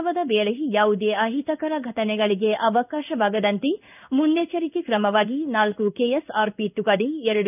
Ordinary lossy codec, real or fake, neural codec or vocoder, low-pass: none; fake; codec, 16 kHz in and 24 kHz out, 1 kbps, XY-Tokenizer; 3.6 kHz